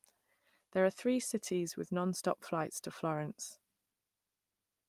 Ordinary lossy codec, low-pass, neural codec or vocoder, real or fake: Opus, 32 kbps; 14.4 kHz; none; real